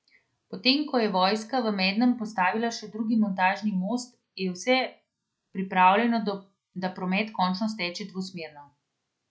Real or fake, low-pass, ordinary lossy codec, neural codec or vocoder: real; none; none; none